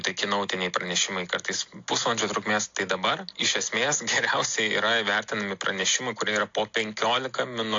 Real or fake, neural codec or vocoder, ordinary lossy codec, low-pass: real; none; AAC, 48 kbps; 7.2 kHz